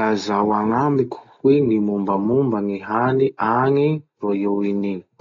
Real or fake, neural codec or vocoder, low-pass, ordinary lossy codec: real; none; 7.2 kHz; AAC, 32 kbps